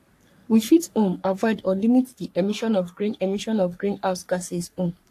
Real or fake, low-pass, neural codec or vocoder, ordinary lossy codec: fake; 14.4 kHz; codec, 44.1 kHz, 3.4 kbps, Pupu-Codec; AAC, 64 kbps